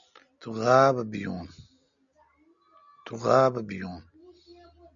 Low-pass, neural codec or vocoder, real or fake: 7.2 kHz; none; real